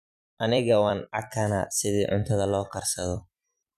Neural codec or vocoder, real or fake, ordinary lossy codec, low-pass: vocoder, 44.1 kHz, 128 mel bands every 256 samples, BigVGAN v2; fake; none; 14.4 kHz